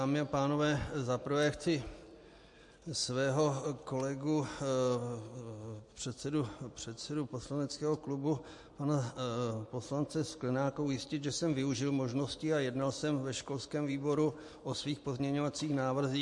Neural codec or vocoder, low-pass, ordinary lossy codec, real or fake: none; 10.8 kHz; MP3, 48 kbps; real